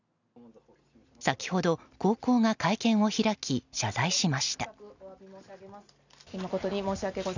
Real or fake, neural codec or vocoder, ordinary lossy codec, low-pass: real; none; none; 7.2 kHz